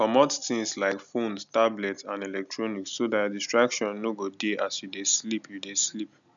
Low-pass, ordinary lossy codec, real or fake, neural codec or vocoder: 7.2 kHz; none; real; none